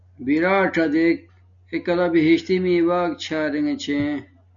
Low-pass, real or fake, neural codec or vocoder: 7.2 kHz; real; none